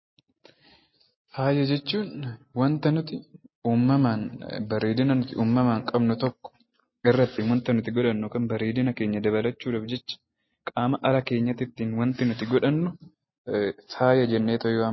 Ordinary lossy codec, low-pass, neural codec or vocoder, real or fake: MP3, 24 kbps; 7.2 kHz; none; real